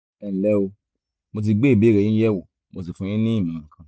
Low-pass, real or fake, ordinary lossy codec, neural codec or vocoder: none; real; none; none